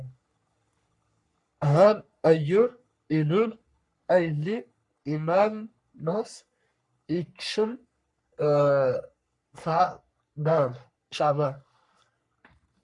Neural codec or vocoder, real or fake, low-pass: codec, 44.1 kHz, 3.4 kbps, Pupu-Codec; fake; 10.8 kHz